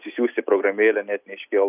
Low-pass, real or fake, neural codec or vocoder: 3.6 kHz; real; none